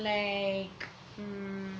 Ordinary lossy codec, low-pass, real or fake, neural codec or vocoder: none; none; real; none